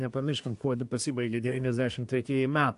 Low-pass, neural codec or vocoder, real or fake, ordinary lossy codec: 10.8 kHz; codec, 24 kHz, 1 kbps, SNAC; fake; AAC, 64 kbps